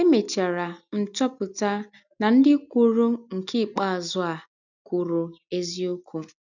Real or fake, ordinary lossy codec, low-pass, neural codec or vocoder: real; none; 7.2 kHz; none